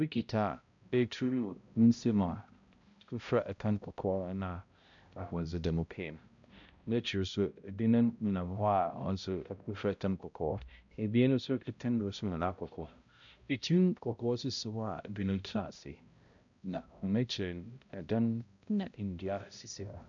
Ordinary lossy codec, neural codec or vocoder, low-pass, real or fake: MP3, 96 kbps; codec, 16 kHz, 0.5 kbps, X-Codec, HuBERT features, trained on balanced general audio; 7.2 kHz; fake